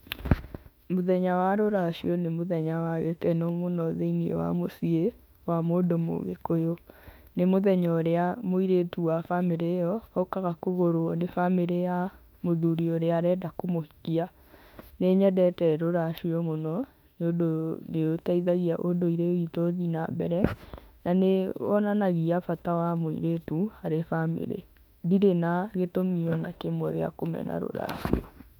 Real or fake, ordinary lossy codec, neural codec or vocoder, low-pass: fake; none; autoencoder, 48 kHz, 32 numbers a frame, DAC-VAE, trained on Japanese speech; 19.8 kHz